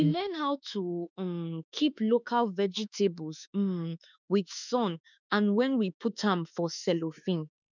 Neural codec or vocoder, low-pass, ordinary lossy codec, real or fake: autoencoder, 48 kHz, 32 numbers a frame, DAC-VAE, trained on Japanese speech; 7.2 kHz; none; fake